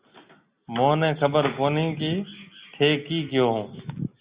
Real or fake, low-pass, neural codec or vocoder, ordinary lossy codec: real; 3.6 kHz; none; Opus, 64 kbps